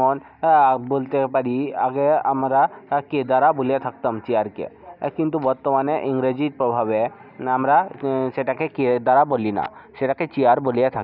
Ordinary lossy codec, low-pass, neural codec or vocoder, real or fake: none; 5.4 kHz; none; real